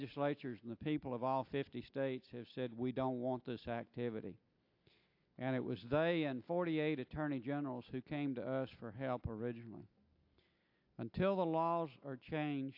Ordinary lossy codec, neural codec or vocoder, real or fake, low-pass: AAC, 48 kbps; none; real; 5.4 kHz